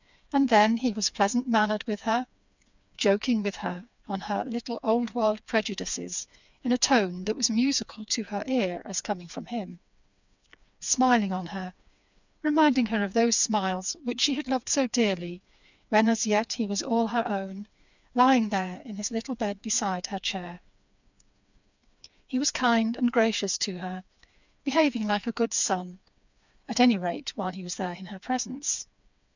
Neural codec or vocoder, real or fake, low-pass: codec, 16 kHz, 4 kbps, FreqCodec, smaller model; fake; 7.2 kHz